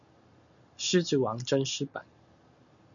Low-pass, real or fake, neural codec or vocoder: 7.2 kHz; real; none